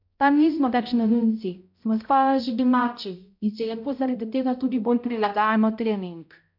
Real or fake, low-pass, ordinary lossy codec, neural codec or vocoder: fake; 5.4 kHz; MP3, 48 kbps; codec, 16 kHz, 0.5 kbps, X-Codec, HuBERT features, trained on balanced general audio